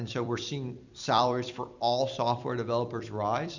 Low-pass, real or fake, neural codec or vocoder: 7.2 kHz; fake; vocoder, 44.1 kHz, 128 mel bands every 256 samples, BigVGAN v2